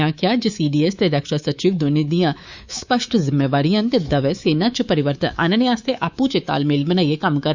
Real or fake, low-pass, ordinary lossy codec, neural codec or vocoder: fake; 7.2 kHz; Opus, 64 kbps; codec, 24 kHz, 3.1 kbps, DualCodec